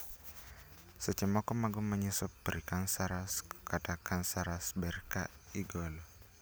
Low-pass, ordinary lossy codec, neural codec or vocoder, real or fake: none; none; none; real